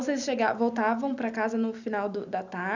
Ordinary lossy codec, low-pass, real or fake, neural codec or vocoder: none; 7.2 kHz; real; none